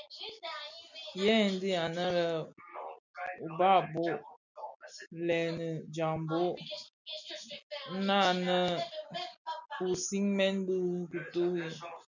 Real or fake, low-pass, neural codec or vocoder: real; 7.2 kHz; none